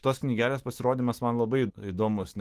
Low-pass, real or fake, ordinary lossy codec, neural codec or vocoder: 14.4 kHz; fake; Opus, 16 kbps; autoencoder, 48 kHz, 128 numbers a frame, DAC-VAE, trained on Japanese speech